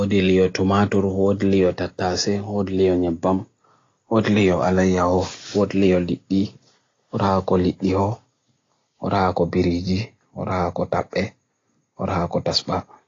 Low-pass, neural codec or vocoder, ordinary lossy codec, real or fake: 7.2 kHz; none; AAC, 32 kbps; real